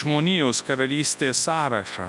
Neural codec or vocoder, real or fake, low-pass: codec, 24 kHz, 0.9 kbps, WavTokenizer, large speech release; fake; 10.8 kHz